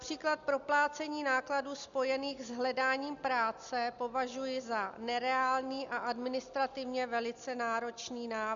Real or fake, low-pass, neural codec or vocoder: real; 7.2 kHz; none